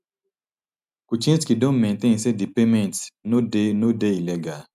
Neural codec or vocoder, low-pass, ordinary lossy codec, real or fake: none; 14.4 kHz; none; real